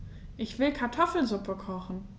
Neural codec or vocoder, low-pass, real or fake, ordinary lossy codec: none; none; real; none